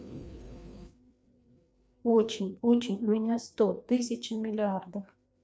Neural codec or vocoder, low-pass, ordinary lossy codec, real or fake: codec, 16 kHz, 2 kbps, FreqCodec, larger model; none; none; fake